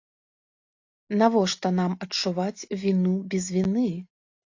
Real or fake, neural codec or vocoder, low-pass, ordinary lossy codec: real; none; 7.2 kHz; AAC, 48 kbps